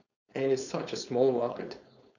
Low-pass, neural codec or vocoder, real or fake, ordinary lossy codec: 7.2 kHz; codec, 16 kHz, 4.8 kbps, FACodec; fake; none